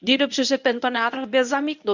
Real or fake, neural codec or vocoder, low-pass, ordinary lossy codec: fake; codec, 24 kHz, 0.9 kbps, WavTokenizer, medium speech release version 1; 7.2 kHz; none